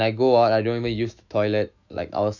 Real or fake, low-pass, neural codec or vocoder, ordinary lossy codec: fake; 7.2 kHz; autoencoder, 48 kHz, 128 numbers a frame, DAC-VAE, trained on Japanese speech; none